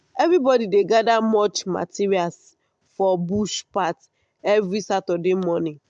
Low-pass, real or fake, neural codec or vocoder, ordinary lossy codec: 9.9 kHz; real; none; MP3, 64 kbps